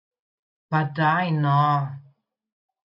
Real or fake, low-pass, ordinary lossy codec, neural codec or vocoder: real; 5.4 kHz; AAC, 48 kbps; none